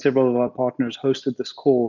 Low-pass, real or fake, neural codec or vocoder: 7.2 kHz; real; none